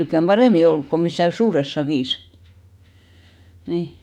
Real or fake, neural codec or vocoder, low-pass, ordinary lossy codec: fake; autoencoder, 48 kHz, 32 numbers a frame, DAC-VAE, trained on Japanese speech; 19.8 kHz; none